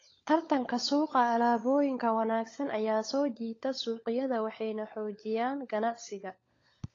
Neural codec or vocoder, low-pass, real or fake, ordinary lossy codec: codec, 16 kHz, 16 kbps, FunCodec, trained on LibriTTS, 50 frames a second; 7.2 kHz; fake; AAC, 32 kbps